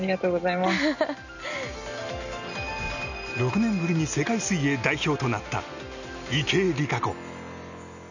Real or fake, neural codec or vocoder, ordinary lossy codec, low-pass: real; none; none; 7.2 kHz